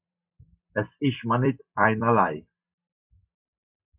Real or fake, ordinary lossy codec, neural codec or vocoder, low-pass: fake; Opus, 64 kbps; vocoder, 44.1 kHz, 128 mel bands every 256 samples, BigVGAN v2; 3.6 kHz